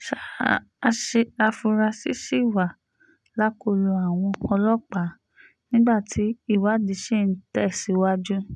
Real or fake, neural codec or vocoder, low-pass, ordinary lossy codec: real; none; none; none